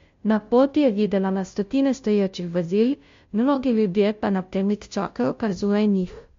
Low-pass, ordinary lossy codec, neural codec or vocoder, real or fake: 7.2 kHz; MP3, 48 kbps; codec, 16 kHz, 0.5 kbps, FunCodec, trained on Chinese and English, 25 frames a second; fake